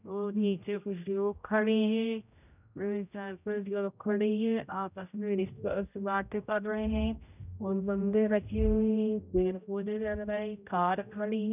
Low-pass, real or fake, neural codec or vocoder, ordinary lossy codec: 3.6 kHz; fake; codec, 16 kHz, 0.5 kbps, X-Codec, HuBERT features, trained on general audio; none